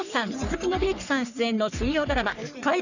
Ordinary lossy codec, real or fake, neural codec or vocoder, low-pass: none; fake; codec, 44.1 kHz, 3.4 kbps, Pupu-Codec; 7.2 kHz